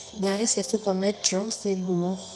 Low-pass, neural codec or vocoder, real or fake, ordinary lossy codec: none; codec, 24 kHz, 0.9 kbps, WavTokenizer, medium music audio release; fake; none